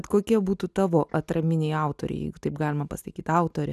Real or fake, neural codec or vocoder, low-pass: real; none; 14.4 kHz